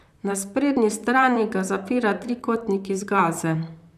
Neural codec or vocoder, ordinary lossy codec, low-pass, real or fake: vocoder, 44.1 kHz, 128 mel bands, Pupu-Vocoder; none; 14.4 kHz; fake